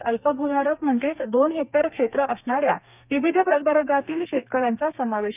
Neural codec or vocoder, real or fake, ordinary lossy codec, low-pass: codec, 32 kHz, 1.9 kbps, SNAC; fake; none; 3.6 kHz